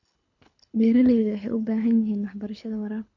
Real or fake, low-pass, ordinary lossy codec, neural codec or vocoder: fake; 7.2 kHz; none; codec, 24 kHz, 6 kbps, HILCodec